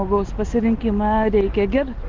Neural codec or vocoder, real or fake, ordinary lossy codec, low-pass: none; real; Opus, 32 kbps; 7.2 kHz